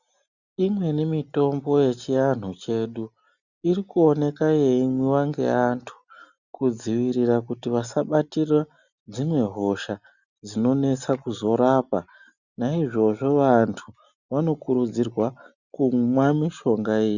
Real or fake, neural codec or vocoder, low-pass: real; none; 7.2 kHz